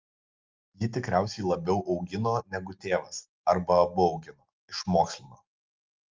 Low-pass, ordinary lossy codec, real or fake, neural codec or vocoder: 7.2 kHz; Opus, 32 kbps; real; none